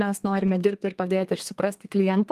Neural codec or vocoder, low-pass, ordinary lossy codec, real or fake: codec, 32 kHz, 1.9 kbps, SNAC; 14.4 kHz; Opus, 32 kbps; fake